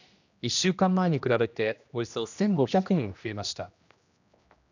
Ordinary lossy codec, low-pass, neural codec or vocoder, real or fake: none; 7.2 kHz; codec, 16 kHz, 1 kbps, X-Codec, HuBERT features, trained on general audio; fake